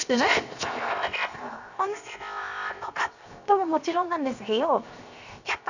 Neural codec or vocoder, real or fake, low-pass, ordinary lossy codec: codec, 16 kHz, 0.7 kbps, FocalCodec; fake; 7.2 kHz; none